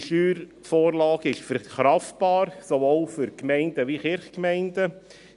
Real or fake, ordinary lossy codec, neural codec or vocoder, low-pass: real; none; none; 10.8 kHz